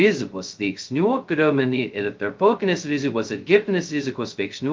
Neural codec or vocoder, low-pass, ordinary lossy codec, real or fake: codec, 16 kHz, 0.2 kbps, FocalCodec; 7.2 kHz; Opus, 32 kbps; fake